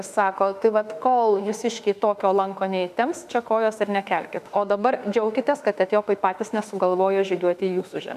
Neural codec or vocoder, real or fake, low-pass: autoencoder, 48 kHz, 32 numbers a frame, DAC-VAE, trained on Japanese speech; fake; 14.4 kHz